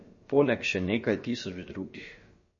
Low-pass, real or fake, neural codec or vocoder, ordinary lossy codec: 7.2 kHz; fake; codec, 16 kHz, about 1 kbps, DyCAST, with the encoder's durations; MP3, 32 kbps